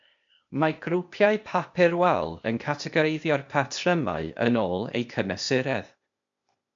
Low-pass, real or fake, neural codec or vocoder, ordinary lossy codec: 7.2 kHz; fake; codec, 16 kHz, 0.8 kbps, ZipCodec; MP3, 64 kbps